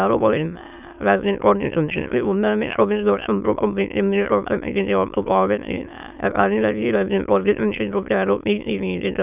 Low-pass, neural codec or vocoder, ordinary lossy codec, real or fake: 3.6 kHz; autoencoder, 22.05 kHz, a latent of 192 numbers a frame, VITS, trained on many speakers; none; fake